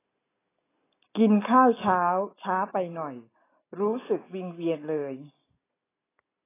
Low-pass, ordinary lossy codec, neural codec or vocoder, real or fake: 3.6 kHz; AAC, 16 kbps; none; real